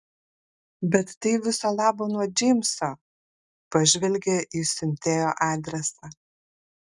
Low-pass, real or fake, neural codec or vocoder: 10.8 kHz; real; none